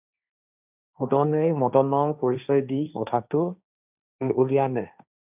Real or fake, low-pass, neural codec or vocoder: fake; 3.6 kHz; codec, 16 kHz, 1.1 kbps, Voila-Tokenizer